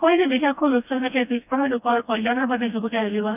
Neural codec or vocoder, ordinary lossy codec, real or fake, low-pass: codec, 16 kHz, 1 kbps, FreqCodec, smaller model; none; fake; 3.6 kHz